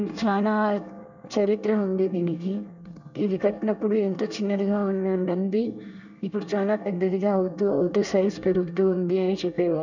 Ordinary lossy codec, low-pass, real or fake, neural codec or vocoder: none; 7.2 kHz; fake; codec, 24 kHz, 1 kbps, SNAC